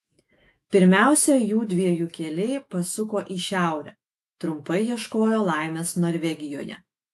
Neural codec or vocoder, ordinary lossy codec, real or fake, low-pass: autoencoder, 48 kHz, 128 numbers a frame, DAC-VAE, trained on Japanese speech; AAC, 64 kbps; fake; 14.4 kHz